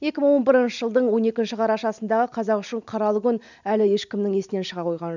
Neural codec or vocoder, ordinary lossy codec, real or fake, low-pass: none; none; real; 7.2 kHz